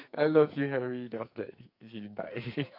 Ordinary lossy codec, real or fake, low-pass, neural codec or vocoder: none; fake; 5.4 kHz; codec, 44.1 kHz, 2.6 kbps, SNAC